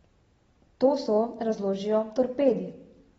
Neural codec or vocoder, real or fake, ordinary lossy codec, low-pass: none; real; AAC, 24 kbps; 10.8 kHz